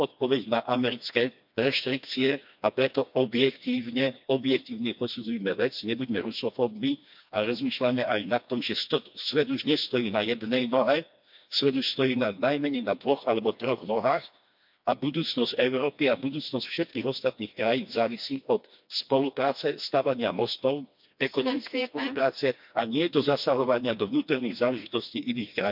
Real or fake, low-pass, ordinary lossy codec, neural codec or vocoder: fake; 5.4 kHz; MP3, 48 kbps; codec, 16 kHz, 2 kbps, FreqCodec, smaller model